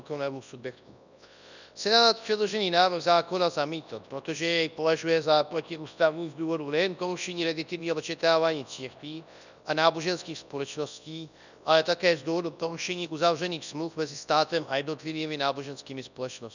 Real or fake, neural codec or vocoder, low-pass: fake; codec, 24 kHz, 0.9 kbps, WavTokenizer, large speech release; 7.2 kHz